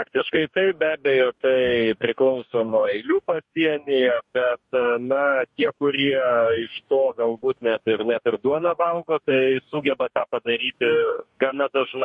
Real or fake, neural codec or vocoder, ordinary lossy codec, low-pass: fake; codec, 44.1 kHz, 2.6 kbps, DAC; MP3, 48 kbps; 10.8 kHz